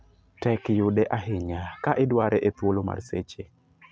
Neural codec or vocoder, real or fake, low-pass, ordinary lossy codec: none; real; none; none